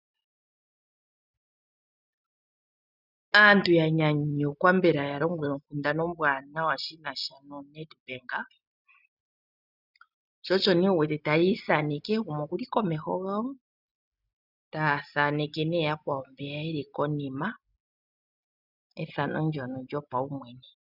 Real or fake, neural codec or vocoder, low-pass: real; none; 5.4 kHz